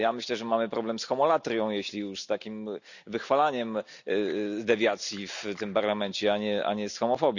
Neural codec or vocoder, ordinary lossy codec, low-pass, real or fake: none; none; 7.2 kHz; real